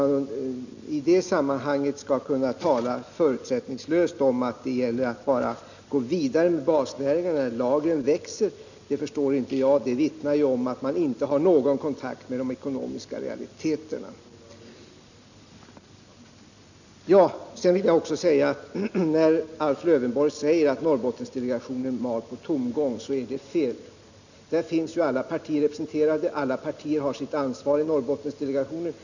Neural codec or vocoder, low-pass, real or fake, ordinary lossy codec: none; 7.2 kHz; real; none